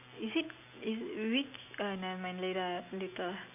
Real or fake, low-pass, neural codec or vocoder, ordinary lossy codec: real; 3.6 kHz; none; none